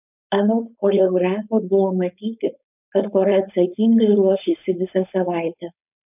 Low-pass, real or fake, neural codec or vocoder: 3.6 kHz; fake; codec, 16 kHz, 4.8 kbps, FACodec